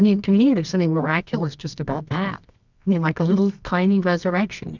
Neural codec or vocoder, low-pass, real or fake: codec, 24 kHz, 0.9 kbps, WavTokenizer, medium music audio release; 7.2 kHz; fake